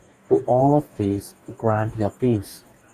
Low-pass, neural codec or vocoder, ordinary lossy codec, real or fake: 14.4 kHz; codec, 44.1 kHz, 2.6 kbps, DAC; Opus, 64 kbps; fake